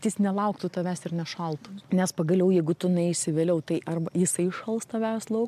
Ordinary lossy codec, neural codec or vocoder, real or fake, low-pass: MP3, 96 kbps; none; real; 14.4 kHz